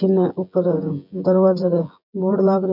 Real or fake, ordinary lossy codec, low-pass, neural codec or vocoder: fake; none; 5.4 kHz; vocoder, 24 kHz, 100 mel bands, Vocos